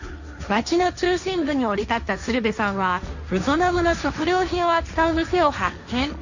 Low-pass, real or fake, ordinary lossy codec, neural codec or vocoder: 7.2 kHz; fake; none; codec, 16 kHz, 1.1 kbps, Voila-Tokenizer